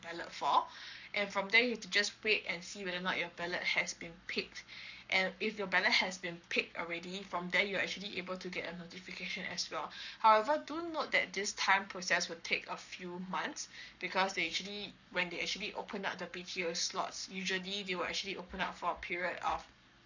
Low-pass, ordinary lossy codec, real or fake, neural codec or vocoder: 7.2 kHz; none; fake; codec, 44.1 kHz, 7.8 kbps, Pupu-Codec